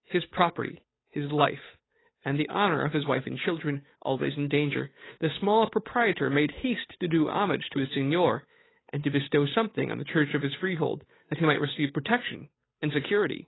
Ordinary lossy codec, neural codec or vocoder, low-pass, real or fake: AAC, 16 kbps; codec, 16 kHz, 8 kbps, FunCodec, trained on Chinese and English, 25 frames a second; 7.2 kHz; fake